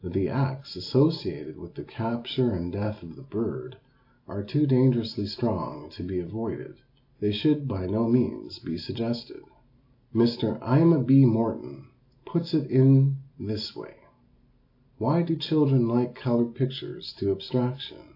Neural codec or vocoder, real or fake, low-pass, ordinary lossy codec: none; real; 5.4 kHz; AAC, 32 kbps